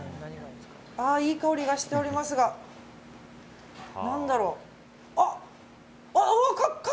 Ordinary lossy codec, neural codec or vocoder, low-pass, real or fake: none; none; none; real